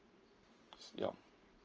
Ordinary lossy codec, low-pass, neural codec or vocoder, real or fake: Opus, 24 kbps; 7.2 kHz; vocoder, 44.1 kHz, 128 mel bands every 512 samples, BigVGAN v2; fake